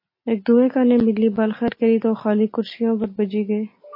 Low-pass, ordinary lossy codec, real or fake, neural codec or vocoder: 5.4 kHz; MP3, 24 kbps; real; none